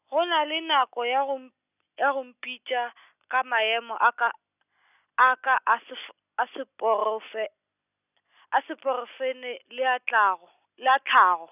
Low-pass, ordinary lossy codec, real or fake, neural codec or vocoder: 3.6 kHz; none; real; none